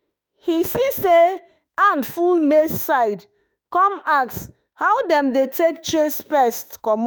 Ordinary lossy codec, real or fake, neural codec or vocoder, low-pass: none; fake; autoencoder, 48 kHz, 32 numbers a frame, DAC-VAE, trained on Japanese speech; none